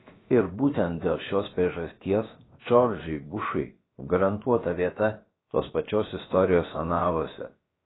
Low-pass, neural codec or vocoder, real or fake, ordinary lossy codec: 7.2 kHz; codec, 16 kHz, about 1 kbps, DyCAST, with the encoder's durations; fake; AAC, 16 kbps